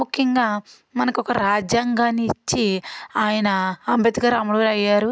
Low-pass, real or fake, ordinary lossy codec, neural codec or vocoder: none; real; none; none